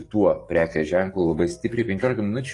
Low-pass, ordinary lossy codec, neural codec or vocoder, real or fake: 10.8 kHz; AAC, 32 kbps; codec, 44.1 kHz, 7.8 kbps, DAC; fake